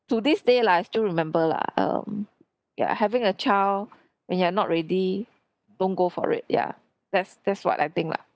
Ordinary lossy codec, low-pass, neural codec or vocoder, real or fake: none; none; none; real